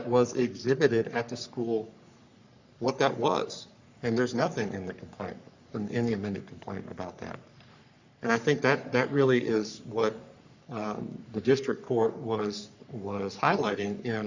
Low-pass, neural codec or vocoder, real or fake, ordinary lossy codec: 7.2 kHz; codec, 44.1 kHz, 3.4 kbps, Pupu-Codec; fake; Opus, 64 kbps